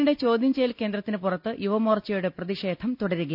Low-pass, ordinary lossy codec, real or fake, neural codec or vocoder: 5.4 kHz; none; real; none